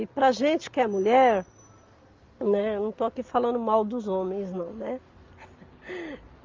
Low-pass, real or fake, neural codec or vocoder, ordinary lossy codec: 7.2 kHz; real; none; Opus, 24 kbps